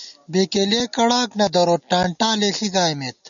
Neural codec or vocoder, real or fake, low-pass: none; real; 7.2 kHz